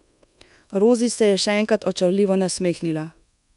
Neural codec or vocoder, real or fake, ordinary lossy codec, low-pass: codec, 24 kHz, 1.2 kbps, DualCodec; fake; none; 10.8 kHz